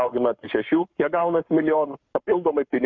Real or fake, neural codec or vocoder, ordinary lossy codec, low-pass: fake; codec, 44.1 kHz, 7.8 kbps, Pupu-Codec; MP3, 64 kbps; 7.2 kHz